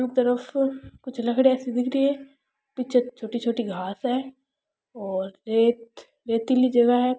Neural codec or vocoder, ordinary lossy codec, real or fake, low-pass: none; none; real; none